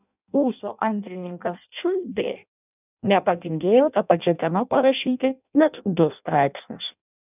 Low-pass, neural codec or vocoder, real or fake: 3.6 kHz; codec, 16 kHz in and 24 kHz out, 0.6 kbps, FireRedTTS-2 codec; fake